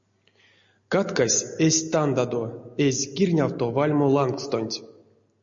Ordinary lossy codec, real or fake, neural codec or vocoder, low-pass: MP3, 48 kbps; real; none; 7.2 kHz